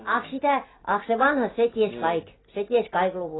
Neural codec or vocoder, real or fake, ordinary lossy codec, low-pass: none; real; AAC, 16 kbps; 7.2 kHz